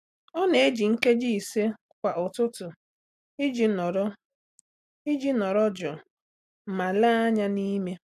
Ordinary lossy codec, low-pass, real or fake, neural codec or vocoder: AAC, 96 kbps; 14.4 kHz; real; none